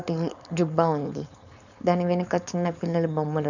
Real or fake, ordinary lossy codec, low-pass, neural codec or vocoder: fake; none; 7.2 kHz; codec, 16 kHz, 4.8 kbps, FACodec